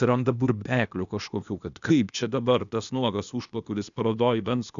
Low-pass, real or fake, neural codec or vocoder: 7.2 kHz; fake; codec, 16 kHz, 0.8 kbps, ZipCodec